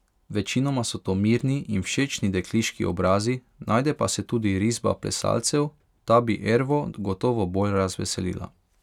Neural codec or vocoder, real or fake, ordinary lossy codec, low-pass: none; real; none; 19.8 kHz